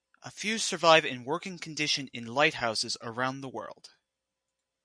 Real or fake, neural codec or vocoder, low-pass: real; none; 9.9 kHz